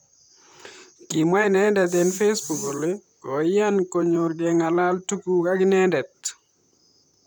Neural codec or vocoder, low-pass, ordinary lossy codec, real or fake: vocoder, 44.1 kHz, 128 mel bands, Pupu-Vocoder; none; none; fake